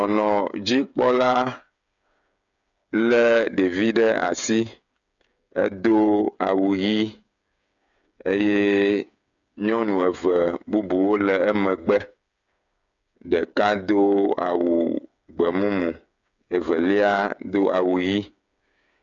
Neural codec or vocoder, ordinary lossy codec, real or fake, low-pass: codec, 16 kHz, 8 kbps, FreqCodec, smaller model; MP3, 96 kbps; fake; 7.2 kHz